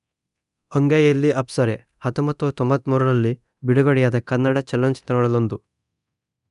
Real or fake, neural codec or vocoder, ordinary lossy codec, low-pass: fake; codec, 24 kHz, 0.9 kbps, DualCodec; none; 10.8 kHz